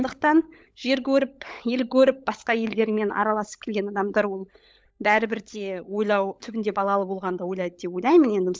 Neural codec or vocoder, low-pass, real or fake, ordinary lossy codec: codec, 16 kHz, 16 kbps, FunCodec, trained on LibriTTS, 50 frames a second; none; fake; none